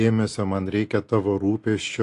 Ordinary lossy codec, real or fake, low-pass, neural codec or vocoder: AAC, 48 kbps; real; 10.8 kHz; none